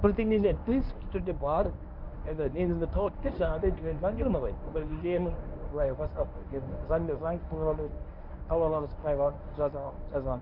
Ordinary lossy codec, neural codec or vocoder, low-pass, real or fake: none; codec, 24 kHz, 0.9 kbps, WavTokenizer, medium speech release version 1; 5.4 kHz; fake